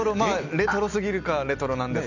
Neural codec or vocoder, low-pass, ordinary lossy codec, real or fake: none; 7.2 kHz; none; real